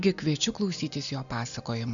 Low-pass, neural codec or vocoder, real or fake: 7.2 kHz; none; real